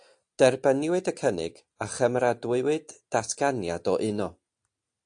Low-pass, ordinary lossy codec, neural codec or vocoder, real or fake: 9.9 kHz; AAC, 64 kbps; none; real